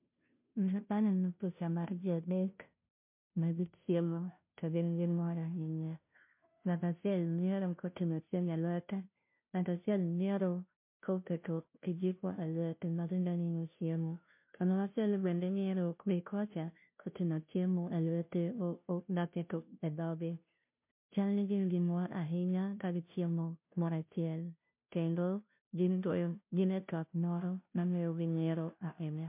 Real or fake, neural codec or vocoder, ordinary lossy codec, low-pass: fake; codec, 16 kHz, 0.5 kbps, FunCodec, trained on Chinese and English, 25 frames a second; MP3, 32 kbps; 3.6 kHz